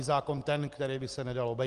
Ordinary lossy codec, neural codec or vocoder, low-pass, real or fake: Opus, 24 kbps; none; 10.8 kHz; real